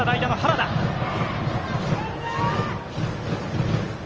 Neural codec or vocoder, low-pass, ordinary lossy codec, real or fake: none; 7.2 kHz; Opus, 24 kbps; real